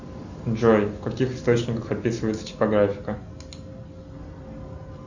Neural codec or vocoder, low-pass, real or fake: none; 7.2 kHz; real